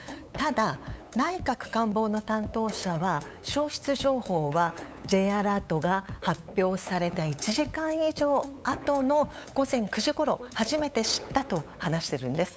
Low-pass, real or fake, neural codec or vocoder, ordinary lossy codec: none; fake; codec, 16 kHz, 8 kbps, FunCodec, trained on LibriTTS, 25 frames a second; none